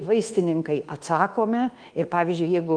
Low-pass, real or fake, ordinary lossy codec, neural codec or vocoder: 9.9 kHz; fake; Opus, 24 kbps; codec, 24 kHz, 1.2 kbps, DualCodec